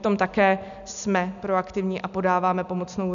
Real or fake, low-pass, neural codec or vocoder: real; 7.2 kHz; none